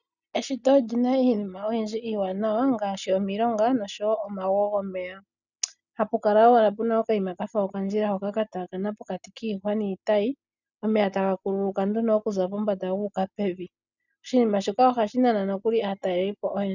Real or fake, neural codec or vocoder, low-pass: real; none; 7.2 kHz